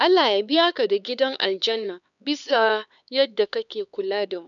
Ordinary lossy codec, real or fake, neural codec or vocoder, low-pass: none; fake; codec, 16 kHz, 4 kbps, X-Codec, HuBERT features, trained on LibriSpeech; 7.2 kHz